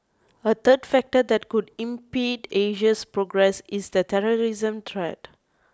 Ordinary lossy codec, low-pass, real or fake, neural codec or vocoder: none; none; real; none